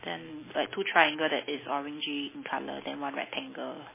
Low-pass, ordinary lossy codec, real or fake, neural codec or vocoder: 3.6 kHz; MP3, 16 kbps; real; none